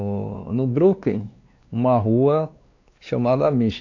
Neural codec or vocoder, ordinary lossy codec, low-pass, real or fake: codec, 16 kHz, 2 kbps, X-Codec, WavLM features, trained on Multilingual LibriSpeech; AAC, 48 kbps; 7.2 kHz; fake